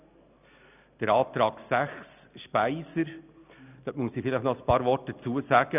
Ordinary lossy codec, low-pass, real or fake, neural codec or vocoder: none; 3.6 kHz; real; none